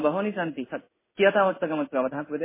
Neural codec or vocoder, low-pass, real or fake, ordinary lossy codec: codec, 16 kHz in and 24 kHz out, 1 kbps, XY-Tokenizer; 3.6 kHz; fake; MP3, 16 kbps